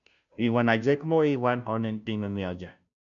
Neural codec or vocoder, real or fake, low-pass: codec, 16 kHz, 0.5 kbps, FunCodec, trained on Chinese and English, 25 frames a second; fake; 7.2 kHz